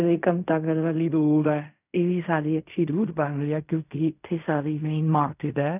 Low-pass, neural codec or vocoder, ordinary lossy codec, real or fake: 3.6 kHz; codec, 16 kHz in and 24 kHz out, 0.4 kbps, LongCat-Audio-Codec, fine tuned four codebook decoder; none; fake